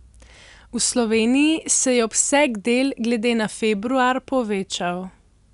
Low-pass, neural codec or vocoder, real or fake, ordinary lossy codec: 10.8 kHz; none; real; none